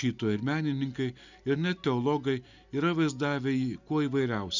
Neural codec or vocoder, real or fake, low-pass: none; real; 7.2 kHz